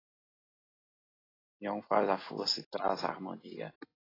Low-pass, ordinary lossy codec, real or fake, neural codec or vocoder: 5.4 kHz; AAC, 24 kbps; real; none